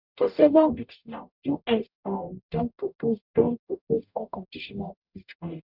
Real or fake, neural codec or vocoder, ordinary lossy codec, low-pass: fake; codec, 44.1 kHz, 0.9 kbps, DAC; none; 5.4 kHz